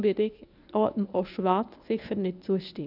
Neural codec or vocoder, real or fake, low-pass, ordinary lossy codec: codec, 24 kHz, 0.9 kbps, WavTokenizer, medium speech release version 1; fake; 5.4 kHz; none